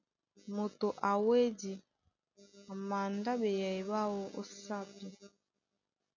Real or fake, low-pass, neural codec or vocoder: real; 7.2 kHz; none